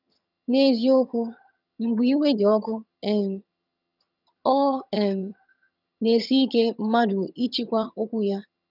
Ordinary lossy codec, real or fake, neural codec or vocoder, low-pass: none; fake; vocoder, 22.05 kHz, 80 mel bands, HiFi-GAN; 5.4 kHz